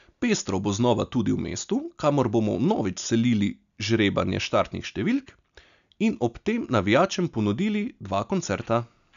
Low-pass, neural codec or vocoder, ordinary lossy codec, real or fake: 7.2 kHz; none; none; real